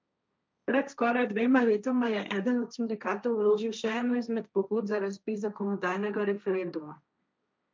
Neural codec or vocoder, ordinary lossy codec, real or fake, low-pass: codec, 16 kHz, 1.1 kbps, Voila-Tokenizer; none; fake; none